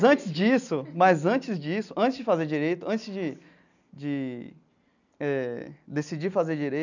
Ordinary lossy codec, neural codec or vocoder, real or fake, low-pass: none; none; real; 7.2 kHz